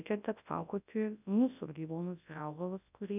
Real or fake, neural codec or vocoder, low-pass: fake; codec, 24 kHz, 0.9 kbps, WavTokenizer, large speech release; 3.6 kHz